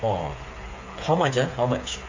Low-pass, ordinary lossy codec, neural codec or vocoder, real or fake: 7.2 kHz; MP3, 64 kbps; codec, 16 kHz, 8 kbps, FreqCodec, smaller model; fake